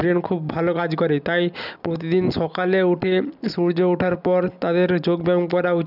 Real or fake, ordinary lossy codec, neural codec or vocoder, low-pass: real; none; none; 5.4 kHz